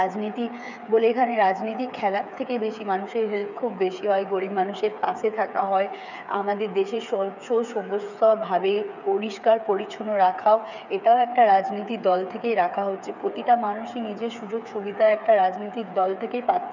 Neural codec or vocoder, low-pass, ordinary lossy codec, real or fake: codec, 16 kHz, 8 kbps, FreqCodec, smaller model; 7.2 kHz; none; fake